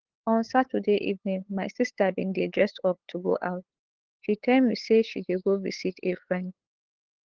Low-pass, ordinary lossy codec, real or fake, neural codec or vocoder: 7.2 kHz; Opus, 16 kbps; fake; codec, 16 kHz, 8 kbps, FunCodec, trained on LibriTTS, 25 frames a second